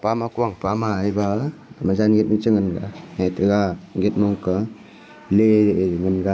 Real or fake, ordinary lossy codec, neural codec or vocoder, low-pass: real; none; none; none